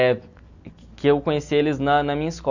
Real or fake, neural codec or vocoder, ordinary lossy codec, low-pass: real; none; none; 7.2 kHz